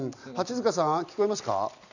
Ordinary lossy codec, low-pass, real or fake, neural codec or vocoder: none; 7.2 kHz; real; none